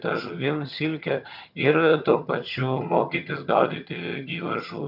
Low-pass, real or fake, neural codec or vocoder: 5.4 kHz; fake; vocoder, 22.05 kHz, 80 mel bands, HiFi-GAN